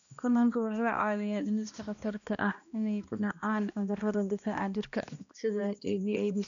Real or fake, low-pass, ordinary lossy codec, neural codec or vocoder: fake; 7.2 kHz; none; codec, 16 kHz, 1 kbps, X-Codec, HuBERT features, trained on balanced general audio